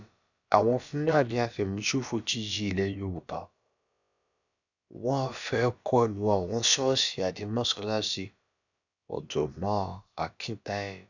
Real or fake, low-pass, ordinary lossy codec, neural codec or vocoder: fake; 7.2 kHz; none; codec, 16 kHz, about 1 kbps, DyCAST, with the encoder's durations